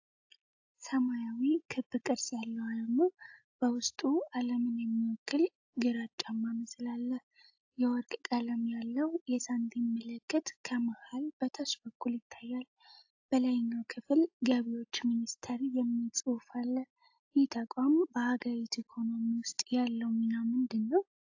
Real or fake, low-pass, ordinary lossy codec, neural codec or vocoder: real; 7.2 kHz; AAC, 48 kbps; none